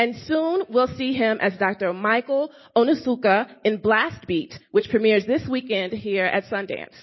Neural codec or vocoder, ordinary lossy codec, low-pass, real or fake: none; MP3, 24 kbps; 7.2 kHz; real